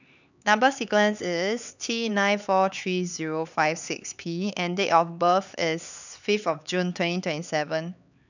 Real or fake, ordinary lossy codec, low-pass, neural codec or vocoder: fake; none; 7.2 kHz; codec, 16 kHz, 4 kbps, X-Codec, HuBERT features, trained on LibriSpeech